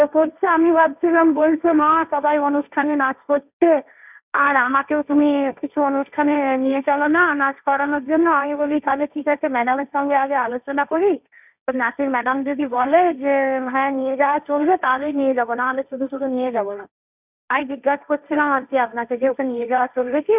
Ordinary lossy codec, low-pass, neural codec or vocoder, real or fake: none; 3.6 kHz; codec, 16 kHz, 1.1 kbps, Voila-Tokenizer; fake